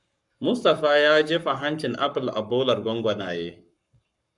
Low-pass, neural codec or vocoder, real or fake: 10.8 kHz; codec, 44.1 kHz, 7.8 kbps, Pupu-Codec; fake